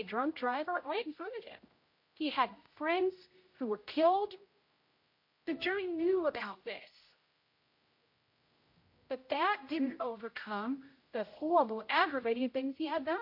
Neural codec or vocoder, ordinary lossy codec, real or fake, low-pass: codec, 16 kHz, 0.5 kbps, X-Codec, HuBERT features, trained on general audio; MP3, 32 kbps; fake; 5.4 kHz